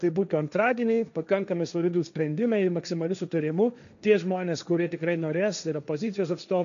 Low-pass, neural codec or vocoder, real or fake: 7.2 kHz; codec, 16 kHz, 1.1 kbps, Voila-Tokenizer; fake